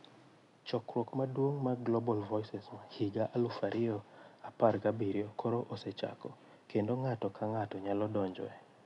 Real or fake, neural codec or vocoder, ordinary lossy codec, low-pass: real; none; none; 10.8 kHz